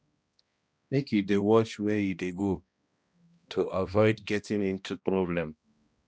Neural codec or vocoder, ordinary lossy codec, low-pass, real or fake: codec, 16 kHz, 1 kbps, X-Codec, HuBERT features, trained on balanced general audio; none; none; fake